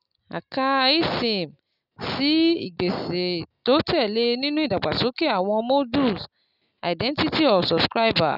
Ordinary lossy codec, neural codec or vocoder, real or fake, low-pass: none; none; real; 5.4 kHz